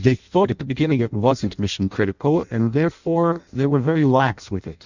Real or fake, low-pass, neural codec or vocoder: fake; 7.2 kHz; codec, 16 kHz in and 24 kHz out, 0.6 kbps, FireRedTTS-2 codec